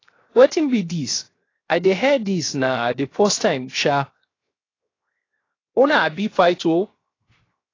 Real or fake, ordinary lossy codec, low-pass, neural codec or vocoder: fake; AAC, 32 kbps; 7.2 kHz; codec, 16 kHz, 0.7 kbps, FocalCodec